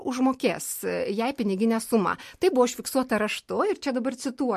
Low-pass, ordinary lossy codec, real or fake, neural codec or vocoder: 14.4 kHz; MP3, 64 kbps; real; none